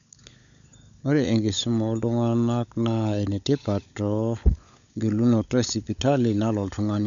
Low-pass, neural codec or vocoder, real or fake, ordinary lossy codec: 7.2 kHz; codec, 16 kHz, 16 kbps, FunCodec, trained on LibriTTS, 50 frames a second; fake; none